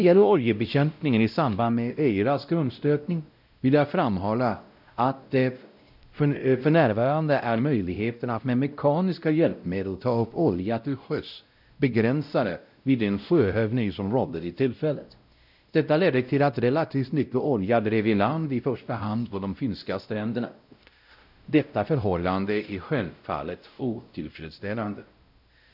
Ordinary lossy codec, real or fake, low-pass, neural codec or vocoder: none; fake; 5.4 kHz; codec, 16 kHz, 0.5 kbps, X-Codec, WavLM features, trained on Multilingual LibriSpeech